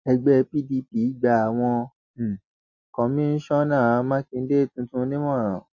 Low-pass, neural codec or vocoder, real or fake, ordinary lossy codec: 7.2 kHz; none; real; MP3, 32 kbps